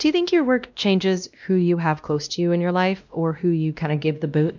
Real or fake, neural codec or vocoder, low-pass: fake; codec, 16 kHz, 1 kbps, X-Codec, WavLM features, trained on Multilingual LibriSpeech; 7.2 kHz